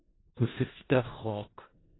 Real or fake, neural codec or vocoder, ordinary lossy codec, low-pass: fake; codec, 16 kHz in and 24 kHz out, 0.4 kbps, LongCat-Audio-Codec, four codebook decoder; AAC, 16 kbps; 7.2 kHz